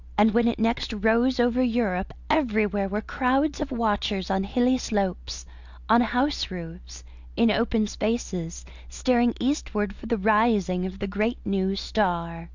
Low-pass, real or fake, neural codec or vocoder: 7.2 kHz; real; none